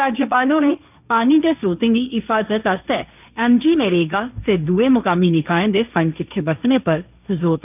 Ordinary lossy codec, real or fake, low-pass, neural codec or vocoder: none; fake; 3.6 kHz; codec, 16 kHz, 1.1 kbps, Voila-Tokenizer